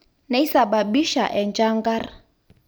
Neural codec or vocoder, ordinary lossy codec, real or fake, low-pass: none; none; real; none